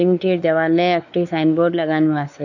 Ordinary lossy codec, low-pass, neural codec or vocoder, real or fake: none; 7.2 kHz; codec, 16 kHz, 4 kbps, X-Codec, WavLM features, trained on Multilingual LibriSpeech; fake